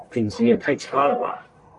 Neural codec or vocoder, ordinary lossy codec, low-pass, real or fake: codec, 44.1 kHz, 1.7 kbps, Pupu-Codec; MP3, 64 kbps; 10.8 kHz; fake